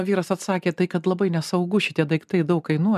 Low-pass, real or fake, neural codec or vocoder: 14.4 kHz; real; none